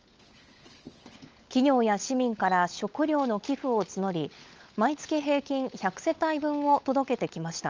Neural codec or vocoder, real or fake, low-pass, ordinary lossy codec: codec, 16 kHz, 16 kbps, FunCodec, trained on LibriTTS, 50 frames a second; fake; 7.2 kHz; Opus, 24 kbps